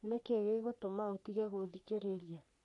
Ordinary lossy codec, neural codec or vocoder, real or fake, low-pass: none; codec, 44.1 kHz, 3.4 kbps, Pupu-Codec; fake; 10.8 kHz